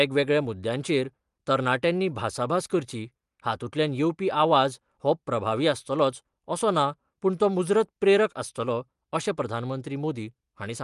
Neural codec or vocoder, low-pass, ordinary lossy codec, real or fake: none; 10.8 kHz; Opus, 32 kbps; real